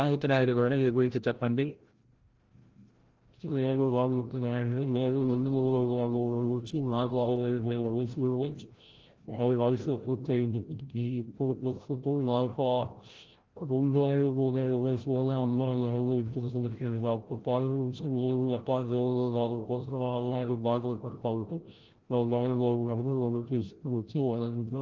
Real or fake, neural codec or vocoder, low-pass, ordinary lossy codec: fake; codec, 16 kHz, 0.5 kbps, FreqCodec, larger model; 7.2 kHz; Opus, 16 kbps